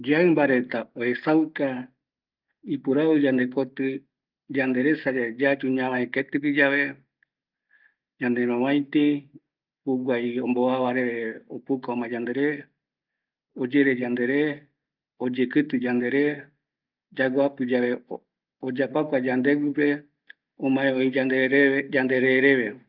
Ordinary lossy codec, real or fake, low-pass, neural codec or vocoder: Opus, 24 kbps; real; 5.4 kHz; none